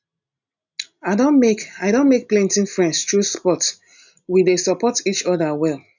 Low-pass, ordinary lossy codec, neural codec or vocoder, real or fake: 7.2 kHz; none; none; real